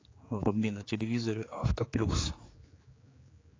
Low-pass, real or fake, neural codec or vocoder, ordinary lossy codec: 7.2 kHz; fake; codec, 16 kHz, 4 kbps, X-Codec, HuBERT features, trained on general audio; AAC, 32 kbps